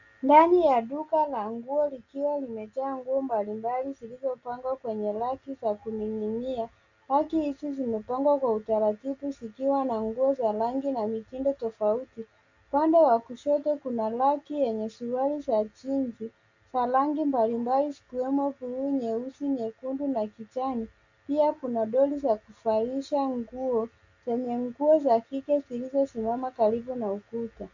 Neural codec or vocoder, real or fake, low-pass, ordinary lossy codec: none; real; 7.2 kHz; Opus, 64 kbps